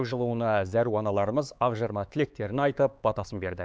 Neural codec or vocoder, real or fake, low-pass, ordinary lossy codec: codec, 16 kHz, 4 kbps, X-Codec, HuBERT features, trained on LibriSpeech; fake; none; none